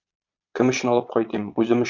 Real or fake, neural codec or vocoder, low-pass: fake; vocoder, 22.05 kHz, 80 mel bands, Vocos; 7.2 kHz